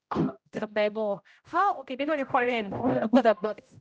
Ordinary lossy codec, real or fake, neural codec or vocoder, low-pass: none; fake; codec, 16 kHz, 0.5 kbps, X-Codec, HuBERT features, trained on general audio; none